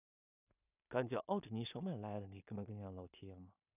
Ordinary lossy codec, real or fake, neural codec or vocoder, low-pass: none; fake; codec, 16 kHz in and 24 kHz out, 0.4 kbps, LongCat-Audio-Codec, two codebook decoder; 3.6 kHz